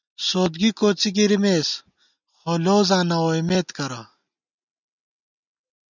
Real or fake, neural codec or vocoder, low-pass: real; none; 7.2 kHz